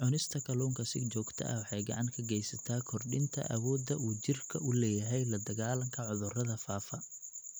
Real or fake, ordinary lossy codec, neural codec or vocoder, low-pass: real; none; none; none